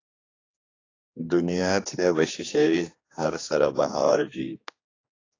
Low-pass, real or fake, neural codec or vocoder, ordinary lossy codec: 7.2 kHz; fake; codec, 16 kHz, 2 kbps, X-Codec, HuBERT features, trained on general audio; AAC, 32 kbps